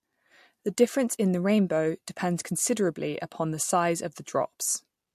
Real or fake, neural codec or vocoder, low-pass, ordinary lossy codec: real; none; 14.4 kHz; MP3, 64 kbps